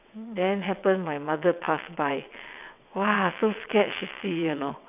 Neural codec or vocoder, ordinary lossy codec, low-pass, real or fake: vocoder, 22.05 kHz, 80 mel bands, WaveNeXt; none; 3.6 kHz; fake